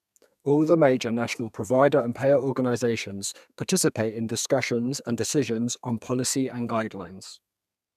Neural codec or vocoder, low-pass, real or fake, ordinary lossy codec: codec, 32 kHz, 1.9 kbps, SNAC; 14.4 kHz; fake; none